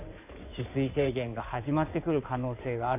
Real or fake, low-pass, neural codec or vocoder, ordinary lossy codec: fake; 3.6 kHz; codec, 16 kHz in and 24 kHz out, 2.2 kbps, FireRedTTS-2 codec; none